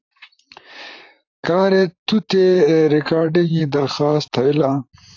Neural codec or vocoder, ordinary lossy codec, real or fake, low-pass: vocoder, 44.1 kHz, 128 mel bands, Pupu-Vocoder; AAC, 48 kbps; fake; 7.2 kHz